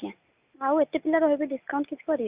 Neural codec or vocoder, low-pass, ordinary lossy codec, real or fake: none; 3.6 kHz; Opus, 64 kbps; real